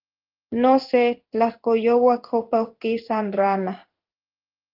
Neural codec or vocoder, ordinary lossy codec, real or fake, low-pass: codec, 16 kHz in and 24 kHz out, 1 kbps, XY-Tokenizer; Opus, 24 kbps; fake; 5.4 kHz